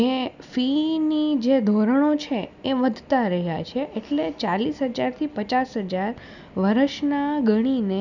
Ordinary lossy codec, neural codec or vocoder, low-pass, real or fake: none; none; 7.2 kHz; real